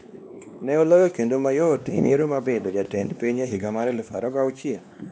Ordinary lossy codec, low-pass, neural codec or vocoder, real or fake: none; none; codec, 16 kHz, 2 kbps, X-Codec, WavLM features, trained on Multilingual LibriSpeech; fake